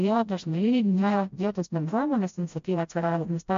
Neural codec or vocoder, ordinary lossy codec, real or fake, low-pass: codec, 16 kHz, 0.5 kbps, FreqCodec, smaller model; MP3, 48 kbps; fake; 7.2 kHz